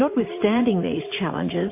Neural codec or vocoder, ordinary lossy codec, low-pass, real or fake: vocoder, 44.1 kHz, 80 mel bands, Vocos; MP3, 24 kbps; 3.6 kHz; fake